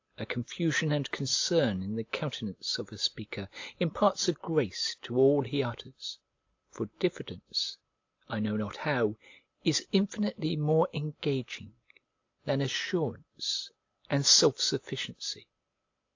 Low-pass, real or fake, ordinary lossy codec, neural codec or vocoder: 7.2 kHz; real; AAC, 48 kbps; none